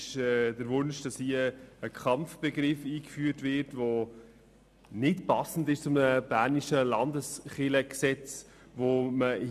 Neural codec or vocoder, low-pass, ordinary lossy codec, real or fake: none; 14.4 kHz; none; real